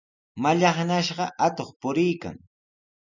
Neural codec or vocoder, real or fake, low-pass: none; real; 7.2 kHz